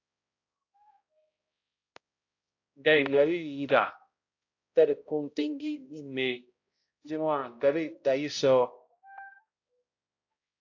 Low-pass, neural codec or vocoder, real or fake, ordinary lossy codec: 7.2 kHz; codec, 16 kHz, 0.5 kbps, X-Codec, HuBERT features, trained on balanced general audio; fake; AAC, 48 kbps